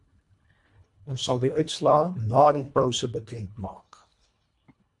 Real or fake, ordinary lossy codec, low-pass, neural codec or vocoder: fake; MP3, 64 kbps; 10.8 kHz; codec, 24 kHz, 1.5 kbps, HILCodec